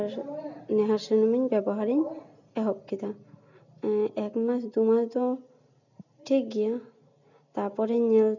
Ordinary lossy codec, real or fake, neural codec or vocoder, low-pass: none; real; none; 7.2 kHz